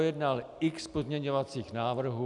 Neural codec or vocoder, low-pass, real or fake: none; 10.8 kHz; real